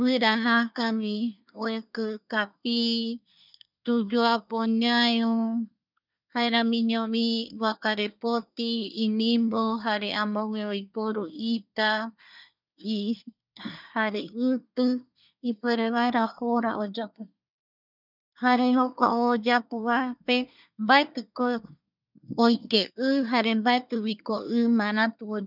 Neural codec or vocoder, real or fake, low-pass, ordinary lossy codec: codec, 24 kHz, 1 kbps, SNAC; fake; 5.4 kHz; none